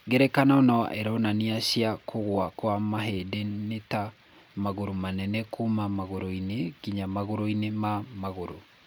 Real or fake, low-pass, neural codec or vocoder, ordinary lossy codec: real; none; none; none